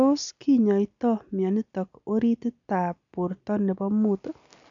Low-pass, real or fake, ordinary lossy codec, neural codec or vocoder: 7.2 kHz; real; none; none